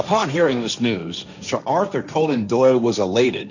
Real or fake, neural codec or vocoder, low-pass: fake; codec, 16 kHz, 1.1 kbps, Voila-Tokenizer; 7.2 kHz